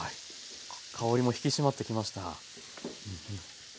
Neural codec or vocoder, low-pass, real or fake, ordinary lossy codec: none; none; real; none